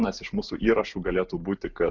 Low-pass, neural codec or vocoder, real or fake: 7.2 kHz; none; real